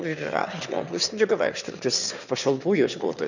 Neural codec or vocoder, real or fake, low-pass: autoencoder, 22.05 kHz, a latent of 192 numbers a frame, VITS, trained on one speaker; fake; 7.2 kHz